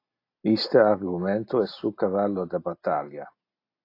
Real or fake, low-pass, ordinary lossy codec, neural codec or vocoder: real; 5.4 kHz; AAC, 32 kbps; none